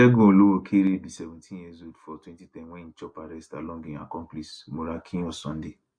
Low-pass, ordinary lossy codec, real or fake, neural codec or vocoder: 9.9 kHz; MP3, 64 kbps; real; none